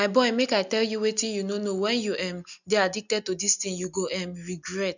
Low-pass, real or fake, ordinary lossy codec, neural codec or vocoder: 7.2 kHz; real; none; none